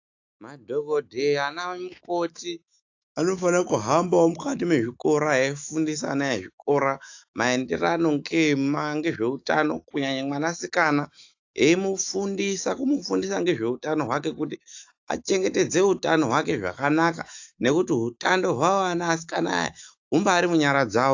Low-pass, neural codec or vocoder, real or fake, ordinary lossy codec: 7.2 kHz; autoencoder, 48 kHz, 128 numbers a frame, DAC-VAE, trained on Japanese speech; fake; AAC, 48 kbps